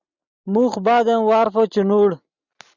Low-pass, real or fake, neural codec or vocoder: 7.2 kHz; real; none